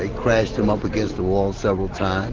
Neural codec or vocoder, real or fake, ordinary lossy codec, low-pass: none; real; Opus, 16 kbps; 7.2 kHz